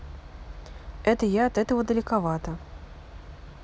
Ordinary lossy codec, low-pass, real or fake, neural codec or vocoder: none; none; real; none